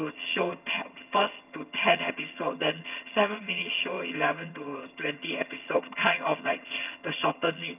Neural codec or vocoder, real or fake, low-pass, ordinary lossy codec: vocoder, 22.05 kHz, 80 mel bands, HiFi-GAN; fake; 3.6 kHz; none